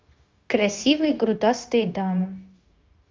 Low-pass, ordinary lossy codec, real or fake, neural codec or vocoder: 7.2 kHz; Opus, 32 kbps; fake; codec, 16 kHz, 0.9 kbps, LongCat-Audio-Codec